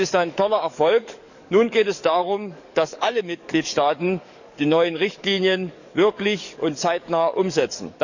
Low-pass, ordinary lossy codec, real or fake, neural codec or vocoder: 7.2 kHz; none; fake; codec, 44.1 kHz, 7.8 kbps, DAC